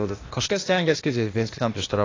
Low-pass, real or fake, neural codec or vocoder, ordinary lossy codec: 7.2 kHz; fake; codec, 16 kHz, 0.8 kbps, ZipCodec; AAC, 32 kbps